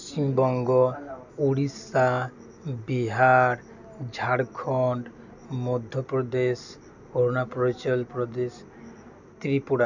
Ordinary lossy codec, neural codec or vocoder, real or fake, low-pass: none; none; real; 7.2 kHz